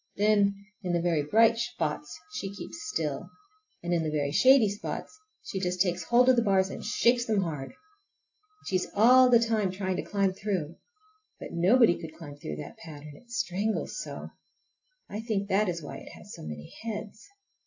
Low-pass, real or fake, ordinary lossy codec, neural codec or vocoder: 7.2 kHz; real; AAC, 48 kbps; none